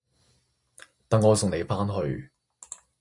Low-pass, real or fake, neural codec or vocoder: 10.8 kHz; real; none